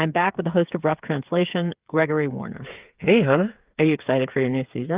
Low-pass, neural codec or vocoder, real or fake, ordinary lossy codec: 3.6 kHz; codec, 16 kHz, 8 kbps, FreqCodec, smaller model; fake; Opus, 32 kbps